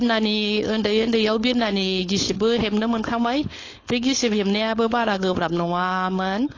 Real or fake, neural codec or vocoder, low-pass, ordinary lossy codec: fake; codec, 16 kHz, 4.8 kbps, FACodec; 7.2 kHz; AAC, 32 kbps